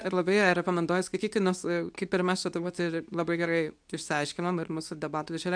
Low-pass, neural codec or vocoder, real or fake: 9.9 kHz; codec, 24 kHz, 0.9 kbps, WavTokenizer, medium speech release version 2; fake